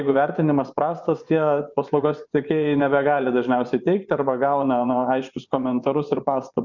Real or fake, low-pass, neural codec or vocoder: fake; 7.2 kHz; vocoder, 24 kHz, 100 mel bands, Vocos